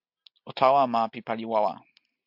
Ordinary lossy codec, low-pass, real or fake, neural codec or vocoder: MP3, 48 kbps; 5.4 kHz; real; none